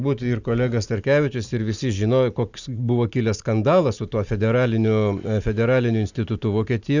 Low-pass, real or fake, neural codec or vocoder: 7.2 kHz; real; none